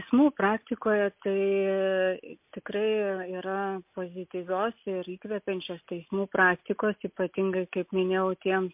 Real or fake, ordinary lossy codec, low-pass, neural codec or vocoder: real; MP3, 32 kbps; 3.6 kHz; none